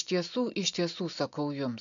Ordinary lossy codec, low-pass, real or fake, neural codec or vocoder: AAC, 64 kbps; 7.2 kHz; real; none